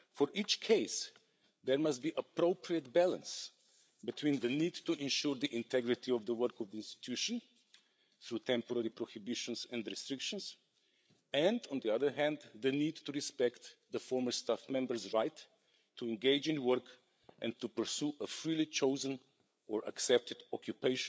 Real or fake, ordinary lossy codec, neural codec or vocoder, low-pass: fake; none; codec, 16 kHz, 8 kbps, FreqCodec, larger model; none